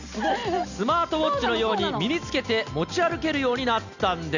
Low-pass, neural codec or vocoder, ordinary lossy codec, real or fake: 7.2 kHz; none; none; real